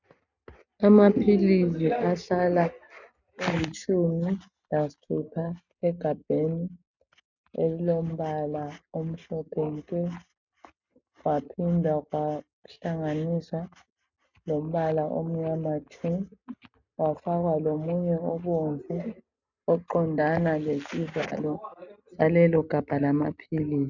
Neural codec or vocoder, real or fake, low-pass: none; real; 7.2 kHz